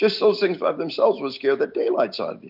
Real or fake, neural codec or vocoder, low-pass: real; none; 5.4 kHz